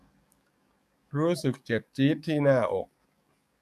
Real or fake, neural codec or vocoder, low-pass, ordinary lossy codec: fake; codec, 44.1 kHz, 7.8 kbps, DAC; 14.4 kHz; none